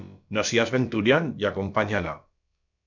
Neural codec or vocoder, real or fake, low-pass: codec, 16 kHz, about 1 kbps, DyCAST, with the encoder's durations; fake; 7.2 kHz